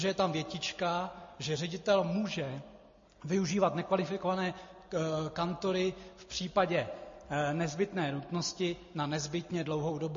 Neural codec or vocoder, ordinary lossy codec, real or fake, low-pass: none; MP3, 32 kbps; real; 7.2 kHz